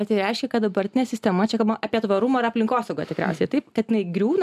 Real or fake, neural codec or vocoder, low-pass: real; none; 14.4 kHz